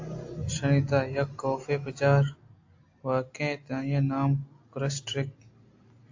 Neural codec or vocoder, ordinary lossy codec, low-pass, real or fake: none; AAC, 48 kbps; 7.2 kHz; real